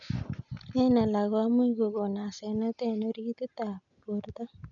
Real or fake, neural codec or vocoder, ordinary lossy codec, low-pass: real; none; none; 7.2 kHz